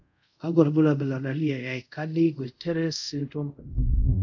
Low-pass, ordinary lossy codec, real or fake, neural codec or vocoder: 7.2 kHz; none; fake; codec, 24 kHz, 0.5 kbps, DualCodec